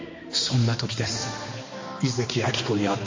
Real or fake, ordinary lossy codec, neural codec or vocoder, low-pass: fake; MP3, 32 kbps; codec, 16 kHz, 2 kbps, X-Codec, HuBERT features, trained on balanced general audio; 7.2 kHz